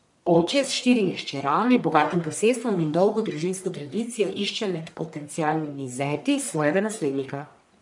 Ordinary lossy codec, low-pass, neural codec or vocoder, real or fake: none; 10.8 kHz; codec, 44.1 kHz, 1.7 kbps, Pupu-Codec; fake